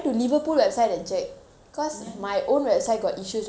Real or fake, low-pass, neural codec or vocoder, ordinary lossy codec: real; none; none; none